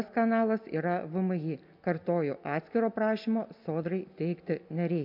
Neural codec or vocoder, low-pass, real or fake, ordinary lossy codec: none; 5.4 kHz; real; MP3, 48 kbps